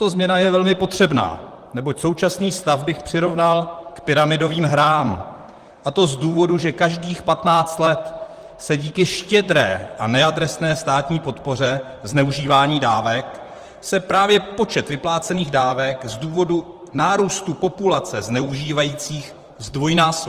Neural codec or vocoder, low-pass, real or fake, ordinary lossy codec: vocoder, 44.1 kHz, 128 mel bands, Pupu-Vocoder; 14.4 kHz; fake; Opus, 24 kbps